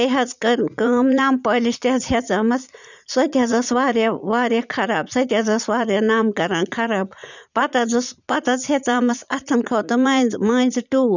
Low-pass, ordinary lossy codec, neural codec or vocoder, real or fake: 7.2 kHz; none; vocoder, 44.1 kHz, 80 mel bands, Vocos; fake